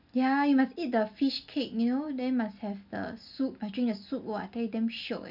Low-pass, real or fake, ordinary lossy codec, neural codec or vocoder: 5.4 kHz; real; none; none